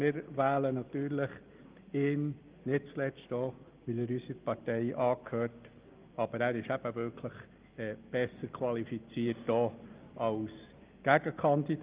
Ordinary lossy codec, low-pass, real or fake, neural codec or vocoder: Opus, 32 kbps; 3.6 kHz; real; none